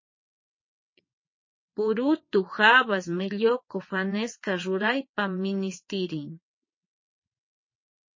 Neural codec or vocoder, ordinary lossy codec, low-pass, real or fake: vocoder, 22.05 kHz, 80 mel bands, WaveNeXt; MP3, 32 kbps; 7.2 kHz; fake